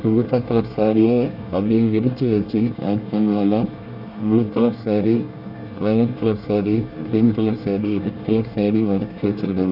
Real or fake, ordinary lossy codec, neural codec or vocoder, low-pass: fake; none; codec, 24 kHz, 1 kbps, SNAC; 5.4 kHz